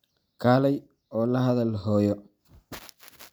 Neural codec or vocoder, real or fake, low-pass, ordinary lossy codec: none; real; none; none